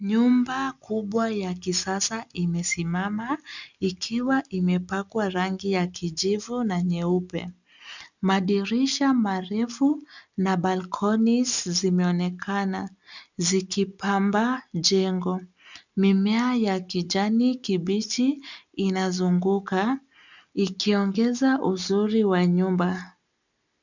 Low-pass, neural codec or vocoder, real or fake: 7.2 kHz; none; real